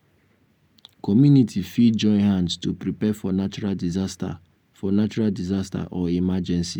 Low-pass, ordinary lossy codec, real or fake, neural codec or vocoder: 19.8 kHz; none; real; none